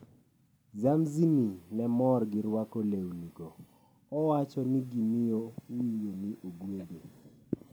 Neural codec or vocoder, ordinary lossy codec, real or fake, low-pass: none; none; real; none